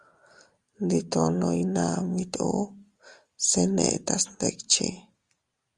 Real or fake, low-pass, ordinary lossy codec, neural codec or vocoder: real; 10.8 kHz; Opus, 32 kbps; none